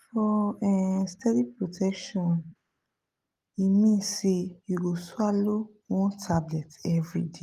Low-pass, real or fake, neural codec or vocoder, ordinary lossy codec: 14.4 kHz; real; none; Opus, 32 kbps